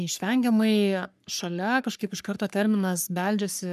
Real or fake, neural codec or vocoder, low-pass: fake; codec, 44.1 kHz, 3.4 kbps, Pupu-Codec; 14.4 kHz